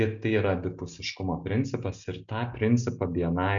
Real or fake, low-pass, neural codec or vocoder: real; 7.2 kHz; none